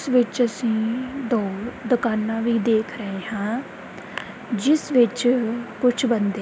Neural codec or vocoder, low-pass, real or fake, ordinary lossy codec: none; none; real; none